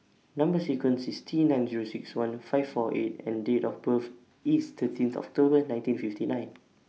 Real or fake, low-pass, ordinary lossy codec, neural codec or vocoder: real; none; none; none